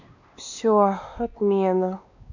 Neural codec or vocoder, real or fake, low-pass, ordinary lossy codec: codec, 16 kHz, 2 kbps, X-Codec, WavLM features, trained on Multilingual LibriSpeech; fake; 7.2 kHz; none